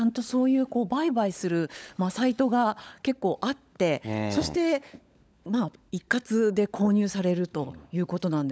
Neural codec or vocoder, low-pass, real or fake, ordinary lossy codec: codec, 16 kHz, 16 kbps, FunCodec, trained on LibriTTS, 50 frames a second; none; fake; none